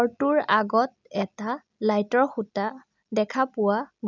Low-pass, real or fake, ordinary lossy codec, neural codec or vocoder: 7.2 kHz; real; none; none